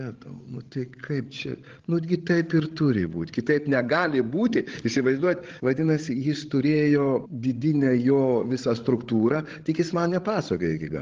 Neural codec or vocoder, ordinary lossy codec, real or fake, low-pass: codec, 16 kHz, 16 kbps, FreqCodec, larger model; Opus, 16 kbps; fake; 7.2 kHz